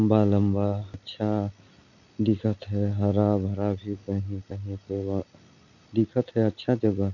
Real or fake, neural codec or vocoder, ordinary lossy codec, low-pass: real; none; none; 7.2 kHz